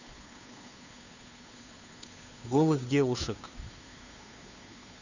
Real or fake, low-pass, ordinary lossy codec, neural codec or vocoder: fake; 7.2 kHz; none; codec, 16 kHz, 2 kbps, FunCodec, trained on Chinese and English, 25 frames a second